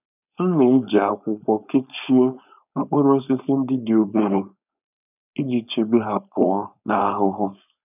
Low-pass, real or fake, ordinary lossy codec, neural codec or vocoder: 3.6 kHz; fake; none; codec, 16 kHz, 4.8 kbps, FACodec